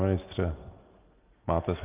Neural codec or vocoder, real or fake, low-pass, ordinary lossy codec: none; real; 3.6 kHz; Opus, 24 kbps